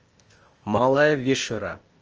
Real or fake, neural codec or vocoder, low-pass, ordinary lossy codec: fake; codec, 16 kHz, 0.8 kbps, ZipCodec; 7.2 kHz; Opus, 24 kbps